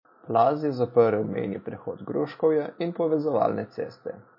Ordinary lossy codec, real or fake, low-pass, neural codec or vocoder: MP3, 24 kbps; real; 5.4 kHz; none